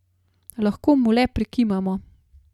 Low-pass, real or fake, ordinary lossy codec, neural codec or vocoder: 19.8 kHz; real; none; none